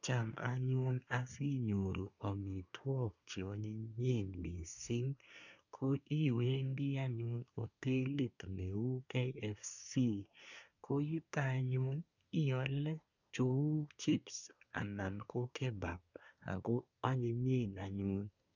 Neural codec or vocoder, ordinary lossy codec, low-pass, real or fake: codec, 24 kHz, 1 kbps, SNAC; none; 7.2 kHz; fake